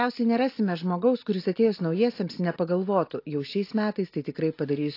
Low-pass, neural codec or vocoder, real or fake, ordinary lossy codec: 5.4 kHz; none; real; AAC, 32 kbps